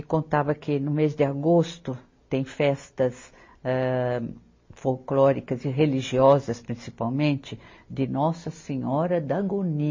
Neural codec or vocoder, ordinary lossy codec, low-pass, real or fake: none; MP3, 32 kbps; 7.2 kHz; real